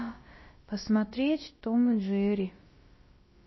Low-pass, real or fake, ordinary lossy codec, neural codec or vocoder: 7.2 kHz; fake; MP3, 24 kbps; codec, 16 kHz, about 1 kbps, DyCAST, with the encoder's durations